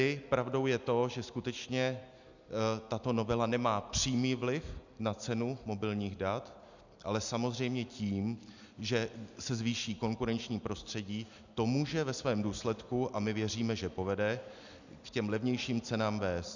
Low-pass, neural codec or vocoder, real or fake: 7.2 kHz; none; real